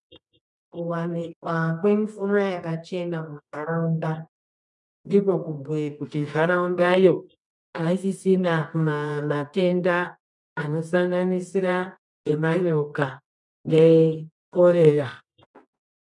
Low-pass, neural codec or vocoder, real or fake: 10.8 kHz; codec, 24 kHz, 0.9 kbps, WavTokenizer, medium music audio release; fake